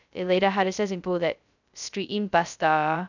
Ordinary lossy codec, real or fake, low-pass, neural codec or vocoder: none; fake; 7.2 kHz; codec, 16 kHz, 0.2 kbps, FocalCodec